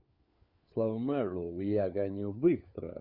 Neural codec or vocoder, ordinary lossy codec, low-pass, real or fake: codec, 16 kHz, 4 kbps, FunCodec, trained on LibriTTS, 50 frames a second; Opus, 64 kbps; 5.4 kHz; fake